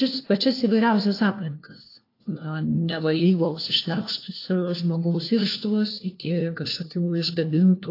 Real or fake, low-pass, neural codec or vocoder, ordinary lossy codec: fake; 5.4 kHz; codec, 16 kHz, 1 kbps, FunCodec, trained on LibriTTS, 50 frames a second; AAC, 32 kbps